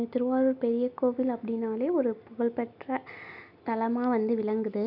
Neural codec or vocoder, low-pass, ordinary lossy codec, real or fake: none; 5.4 kHz; none; real